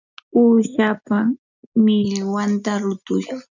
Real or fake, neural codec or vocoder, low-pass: real; none; 7.2 kHz